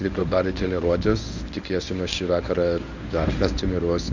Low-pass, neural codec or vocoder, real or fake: 7.2 kHz; codec, 24 kHz, 0.9 kbps, WavTokenizer, medium speech release version 1; fake